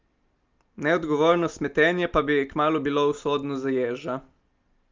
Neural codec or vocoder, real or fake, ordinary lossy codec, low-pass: none; real; Opus, 32 kbps; 7.2 kHz